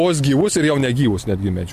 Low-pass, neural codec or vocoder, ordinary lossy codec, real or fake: 14.4 kHz; none; MP3, 64 kbps; real